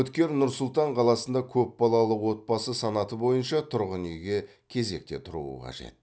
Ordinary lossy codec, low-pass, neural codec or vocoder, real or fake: none; none; none; real